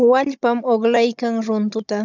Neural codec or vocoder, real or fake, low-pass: codec, 16 kHz, 16 kbps, FunCodec, trained on Chinese and English, 50 frames a second; fake; 7.2 kHz